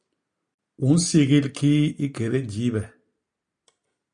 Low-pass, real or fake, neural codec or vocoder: 9.9 kHz; real; none